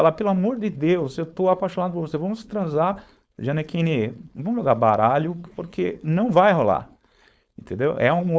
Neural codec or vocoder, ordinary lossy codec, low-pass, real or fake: codec, 16 kHz, 4.8 kbps, FACodec; none; none; fake